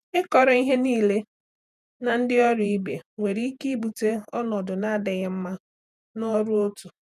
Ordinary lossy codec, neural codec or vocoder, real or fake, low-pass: none; vocoder, 48 kHz, 128 mel bands, Vocos; fake; 14.4 kHz